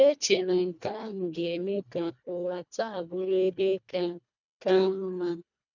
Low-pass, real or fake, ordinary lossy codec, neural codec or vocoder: 7.2 kHz; fake; none; codec, 24 kHz, 1.5 kbps, HILCodec